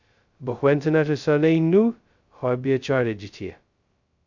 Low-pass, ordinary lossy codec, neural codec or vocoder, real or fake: 7.2 kHz; Opus, 64 kbps; codec, 16 kHz, 0.2 kbps, FocalCodec; fake